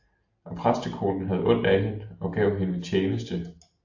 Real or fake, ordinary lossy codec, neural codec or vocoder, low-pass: real; AAC, 48 kbps; none; 7.2 kHz